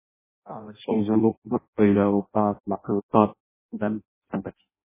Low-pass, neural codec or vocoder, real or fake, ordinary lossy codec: 3.6 kHz; codec, 16 kHz in and 24 kHz out, 0.6 kbps, FireRedTTS-2 codec; fake; MP3, 16 kbps